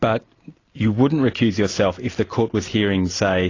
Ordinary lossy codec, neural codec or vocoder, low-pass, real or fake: AAC, 32 kbps; none; 7.2 kHz; real